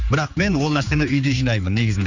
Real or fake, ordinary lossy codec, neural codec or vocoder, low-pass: fake; Opus, 64 kbps; codec, 16 kHz, 4 kbps, X-Codec, HuBERT features, trained on general audio; 7.2 kHz